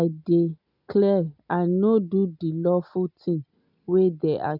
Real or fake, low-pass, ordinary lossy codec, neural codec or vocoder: real; 5.4 kHz; none; none